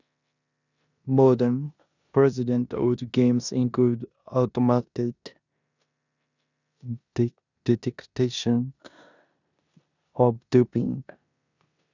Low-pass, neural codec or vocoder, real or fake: 7.2 kHz; codec, 16 kHz in and 24 kHz out, 0.9 kbps, LongCat-Audio-Codec, four codebook decoder; fake